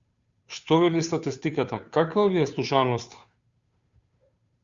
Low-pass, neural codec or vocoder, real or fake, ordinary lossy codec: 7.2 kHz; codec, 16 kHz, 2 kbps, FunCodec, trained on Chinese and English, 25 frames a second; fake; Opus, 64 kbps